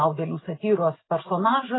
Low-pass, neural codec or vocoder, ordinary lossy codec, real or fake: 7.2 kHz; none; AAC, 16 kbps; real